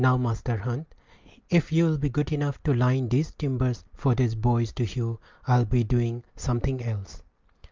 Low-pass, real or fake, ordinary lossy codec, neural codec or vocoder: 7.2 kHz; real; Opus, 32 kbps; none